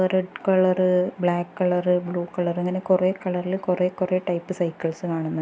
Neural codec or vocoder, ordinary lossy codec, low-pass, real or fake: none; none; none; real